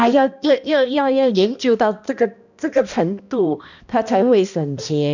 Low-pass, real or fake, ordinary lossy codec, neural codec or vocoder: 7.2 kHz; fake; none; codec, 16 kHz, 1 kbps, X-Codec, HuBERT features, trained on balanced general audio